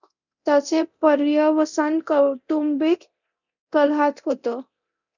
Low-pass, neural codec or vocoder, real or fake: 7.2 kHz; codec, 24 kHz, 0.9 kbps, DualCodec; fake